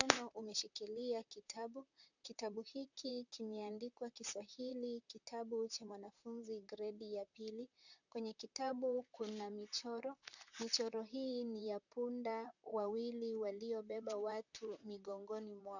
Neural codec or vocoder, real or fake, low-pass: vocoder, 44.1 kHz, 128 mel bands, Pupu-Vocoder; fake; 7.2 kHz